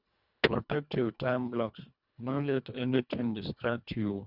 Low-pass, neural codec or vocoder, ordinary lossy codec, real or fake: 5.4 kHz; codec, 24 kHz, 1.5 kbps, HILCodec; none; fake